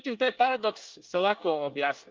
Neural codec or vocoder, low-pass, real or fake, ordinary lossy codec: codec, 24 kHz, 1 kbps, SNAC; 7.2 kHz; fake; Opus, 24 kbps